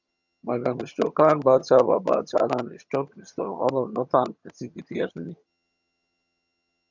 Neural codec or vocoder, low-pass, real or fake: vocoder, 22.05 kHz, 80 mel bands, HiFi-GAN; 7.2 kHz; fake